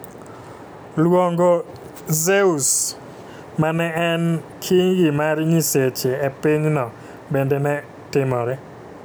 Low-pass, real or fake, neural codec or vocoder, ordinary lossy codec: none; real; none; none